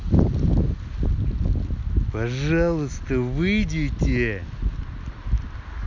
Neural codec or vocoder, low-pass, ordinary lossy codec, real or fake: none; 7.2 kHz; none; real